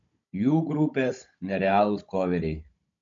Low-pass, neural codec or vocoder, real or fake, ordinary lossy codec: 7.2 kHz; codec, 16 kHz, 16 kbps, FunCodec, trained on Chinese and English, 50 frames a second; fake; MP3, 64 kbps